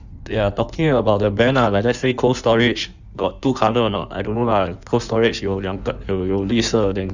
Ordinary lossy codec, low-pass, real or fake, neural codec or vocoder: none; 7.2 kHz; fake; codec, 16 kHz in and 24 kHz out, 1.1 kbps, FireRedTTS-2 codec